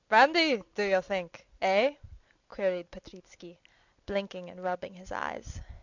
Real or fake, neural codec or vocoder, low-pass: real; none; 7.2 kHz